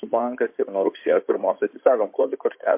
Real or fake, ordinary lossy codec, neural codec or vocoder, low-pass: fake; MP3, 32 kbps; codec, 16 kHz in and 24 kHz out, 2.2 kbps, FireRedTTS-2 codec; 3.6 kHz